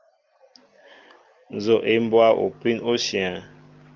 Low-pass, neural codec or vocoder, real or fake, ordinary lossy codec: 7.2 kHz; none; real; Opus, 24 kbps